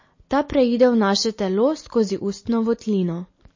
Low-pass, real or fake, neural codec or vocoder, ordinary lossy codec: 7.2 kHz; real; none; MP3, 32 kbps